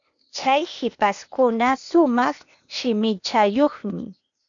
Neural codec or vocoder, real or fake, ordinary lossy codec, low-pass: codec, 16 kHz, 0.8 kbps, ZipCodec; fake; MP3, 96 kbps; 7.2 kHz